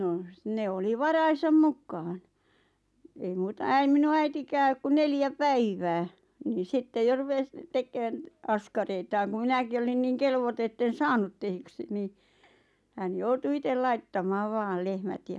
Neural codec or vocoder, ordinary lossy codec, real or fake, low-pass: none; none; real; none